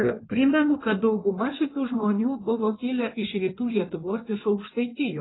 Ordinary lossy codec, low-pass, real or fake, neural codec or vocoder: AAC, 16 kbps; 7.2 kHz; fake; codec, 16 kHz in and 24 kHz out, 1.1 kbps, FireRedTTS-2 codec